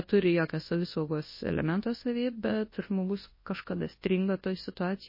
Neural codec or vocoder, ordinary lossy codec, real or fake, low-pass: codec, 24 kHz, 0.9 kbps, WavTokenizer, large speech release; MP3, 24 kbps; fake; 5.4 kHz